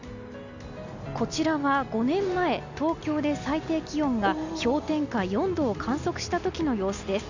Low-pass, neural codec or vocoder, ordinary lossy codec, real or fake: 7.2 kHz; none; none; real